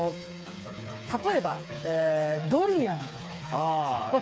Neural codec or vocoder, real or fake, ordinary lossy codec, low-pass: codec, 16 kHz, 4 kbps, FreqCodec, smaller model; fake; none; none